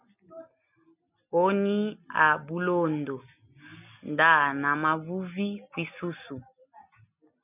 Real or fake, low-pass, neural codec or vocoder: real; 3.6 kHz; none